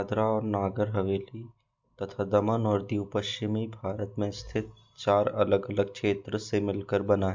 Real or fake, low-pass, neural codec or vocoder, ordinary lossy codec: real; 7.2 kHz; none; MP3, 64 kbps